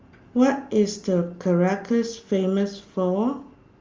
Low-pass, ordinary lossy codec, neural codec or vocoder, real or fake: 7.2 kHz; Opus, 32 kbps; none; real